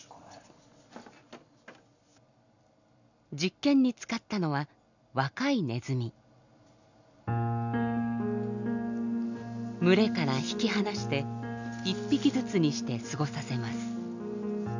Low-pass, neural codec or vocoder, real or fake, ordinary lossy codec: 7.2 kHz; none; real; none